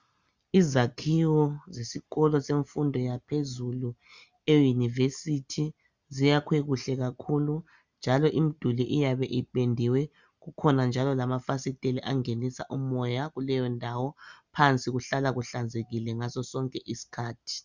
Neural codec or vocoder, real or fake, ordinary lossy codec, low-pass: none; real; Opus, 64 kbps; 7.2 kHz